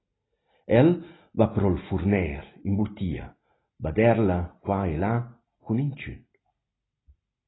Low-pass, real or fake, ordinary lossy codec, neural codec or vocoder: 7.2 kHz; real; AAC, 16 kbps; none